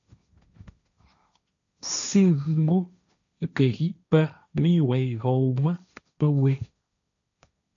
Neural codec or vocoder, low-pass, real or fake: codec, 16 kHz, 1.1 kbps, Voila-Tokenizer; 7.2 kHz; fake